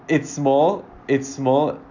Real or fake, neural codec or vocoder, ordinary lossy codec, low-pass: real; none; none; 7.2 kHz